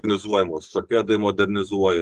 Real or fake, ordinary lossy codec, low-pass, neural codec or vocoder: fake; Opus, 24 kbps; 14.4 kHz; codec, 44.1 kHz, 7.8 kbps, DAC